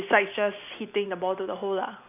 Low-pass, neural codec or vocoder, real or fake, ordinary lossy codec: 3.6 kHz; none; real; none